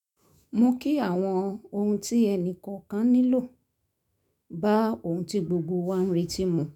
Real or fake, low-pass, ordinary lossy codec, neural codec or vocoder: fake; 19.8 kHz; none; autoencoder, 48 kHz, 128 numbers a frame, DAC-VAE, trained on Japanese speech